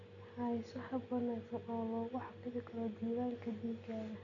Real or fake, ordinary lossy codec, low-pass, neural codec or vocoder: real; none; 7.2 kHz; none